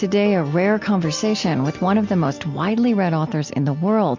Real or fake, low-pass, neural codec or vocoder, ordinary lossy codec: real; 7.2 kHz; none; MP3, 48 kbps